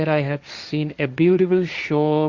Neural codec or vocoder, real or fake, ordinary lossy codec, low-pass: codec, 16 kHz, 1.1 kbps, Voila-Tokenizer; fake; Opus, 64 kbps; 7.2 kHz